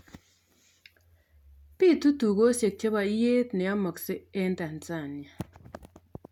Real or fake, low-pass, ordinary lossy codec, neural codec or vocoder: real; 19.8 kHz; none; none